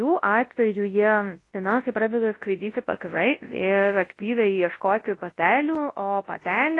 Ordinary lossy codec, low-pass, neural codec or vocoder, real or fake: AAC, 32 kbps; 10.8 kHz; codec, 24 kHz, 0.9 kbps, WavTokenizer, large speech release; fake